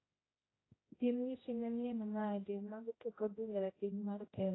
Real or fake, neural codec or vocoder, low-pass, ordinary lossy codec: fake; codec, 16 kHz, 0.5 kbps, X-Codec, HuBERT features, trained on general audio; 7.2 kHz; AAC, 16 kbps